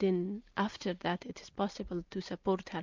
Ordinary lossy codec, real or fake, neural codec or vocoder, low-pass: MP3, 64 kbps; real; none; 7.2 kHz